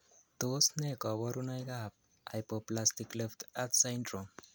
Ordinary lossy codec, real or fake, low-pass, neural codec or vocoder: none; real; none; none